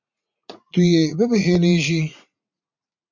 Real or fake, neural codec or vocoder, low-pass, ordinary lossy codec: fake; vocoder, 44.1 kHz, 80 mel bands, Vocos; 7.2 kHz; MP3, 48 kbps